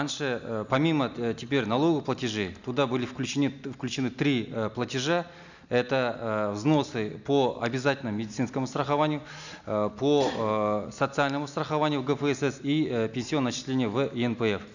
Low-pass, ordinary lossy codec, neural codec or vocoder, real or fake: 7.2 kHz; none; none; real